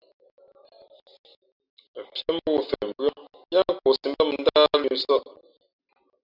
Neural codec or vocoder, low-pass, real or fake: none; 5.4 kHz; real